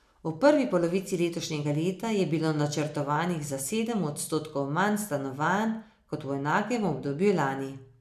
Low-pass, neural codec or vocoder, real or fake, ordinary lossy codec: 14.4 kHz; none; real; none